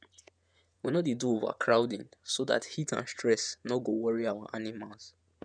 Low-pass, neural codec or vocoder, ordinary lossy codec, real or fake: 9.9 kHz; vocoder, 48 kHz, 128 mel bands, Vocos; none; fake